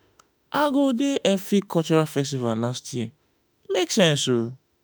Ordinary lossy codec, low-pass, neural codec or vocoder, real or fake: none; none; autoencoder, 48 kHz, 32 numbers a frame, DAC-VAE, trained on Japanese speech; fake